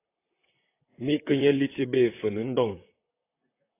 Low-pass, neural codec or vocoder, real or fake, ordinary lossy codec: 3.6 kHz; vocoder, 44.1 kHz, 128 mel bands, Pupu-Vocoder; fake; AAC, 24 kbps